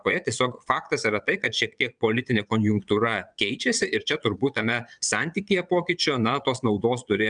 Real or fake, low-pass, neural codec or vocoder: fake; 9.9 kHz; vocoder, 22.05 kHz, 80 mel bands, Vocos